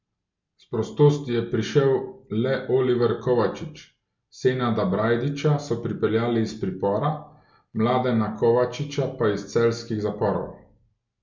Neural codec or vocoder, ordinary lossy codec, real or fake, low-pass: none; MP3, 64 kbps; real; 7.2 kHz